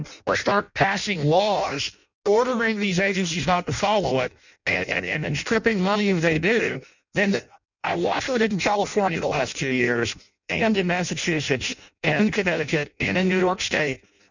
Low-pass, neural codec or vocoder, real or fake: 7.2 kHz; codec, 16 kHz in and 24 kHz out, 0.6 kbps, FireRedTTS-2 codec; fake